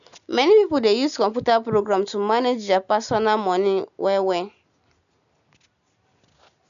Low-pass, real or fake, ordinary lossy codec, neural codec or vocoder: 7.2 kHz; real; none; none